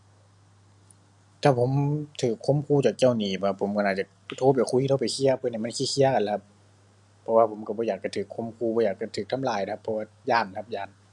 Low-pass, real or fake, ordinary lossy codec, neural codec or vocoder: 10.8 kHz; real; none; none